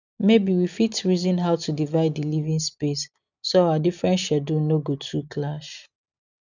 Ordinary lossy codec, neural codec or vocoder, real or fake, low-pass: none; none; real; 7.2 kHz